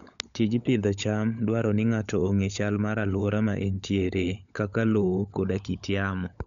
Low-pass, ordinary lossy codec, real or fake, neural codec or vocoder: 7.2 kHz; none; fake; codec, 16 kHz, 16 kbps, FunCodec, trained on LibriTTS, 50 frames a second